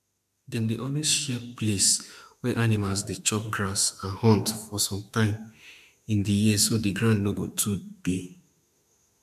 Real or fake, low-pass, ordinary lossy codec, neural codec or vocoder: fake; 14.4 kHz; MP3, 96 kbps; autoencoder, 48 kHz, 32 numbers a frame, DAC-VAE, trained on Japanese speech